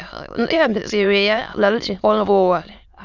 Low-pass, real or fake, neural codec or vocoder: 7.2 kHz; fake; autoencoder, 22.05 kHz, a latent of 192 numbers a frame, VITS, trained on many speakers